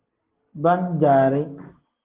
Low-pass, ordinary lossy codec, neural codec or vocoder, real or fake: 3.6 kHz; Opus, 16 kbps; none; real